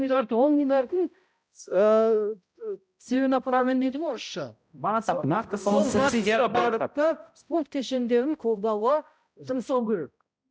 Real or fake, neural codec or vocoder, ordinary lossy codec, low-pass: fake; codec, 16 kHz, 0.5 kbps, X-Codec, HuBERT features, trained on balanced general audio; none; none